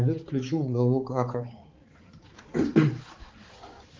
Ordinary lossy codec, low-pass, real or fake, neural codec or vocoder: Opus, 32 kbps; 7.2 kHz; fake; codec, 16 kHz, 4 kbps, X-Codec, HuBERT features, trained on balanced general audio